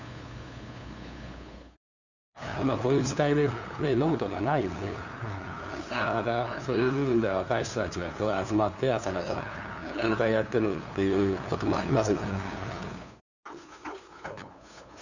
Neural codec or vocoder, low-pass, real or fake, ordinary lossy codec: codec, 16 kHz, 2 kbps, FunCodec, trained on LibriTTS, 25 frames a second; 7.2 kHz; fake; none